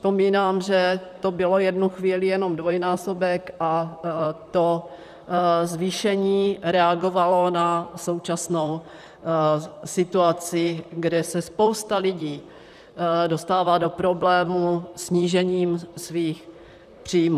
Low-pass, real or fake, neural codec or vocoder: 14.4 kHz; fake; vocoder, 44.1 kHz, 128 mel bands, Pupu-Vocoder